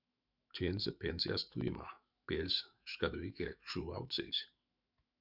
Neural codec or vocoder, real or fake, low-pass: autoencoder, 48 kHz, 128 numbers a frame, DAC-VAE, trained on Japanese speech; fake; 5.4 kHz